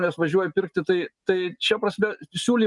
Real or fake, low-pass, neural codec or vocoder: real; 10.8 kHz; none